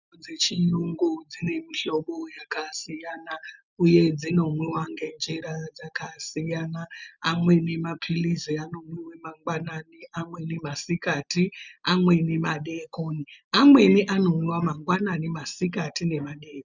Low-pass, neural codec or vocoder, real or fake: 7.2 kHz; none; real